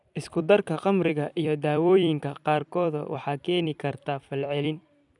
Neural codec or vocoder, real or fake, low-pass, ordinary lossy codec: vocoder, 44.1 kHz, 128 mel bands every 256 samples, BigVGAN v2; fake; 10.8 kHz; none